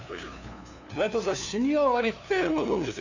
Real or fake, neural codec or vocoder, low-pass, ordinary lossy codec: fake; codec, 16 kHz, 2 kbps, FunCodec, trained on LibriTTS, 25 frames a second; 7.2 kHz; none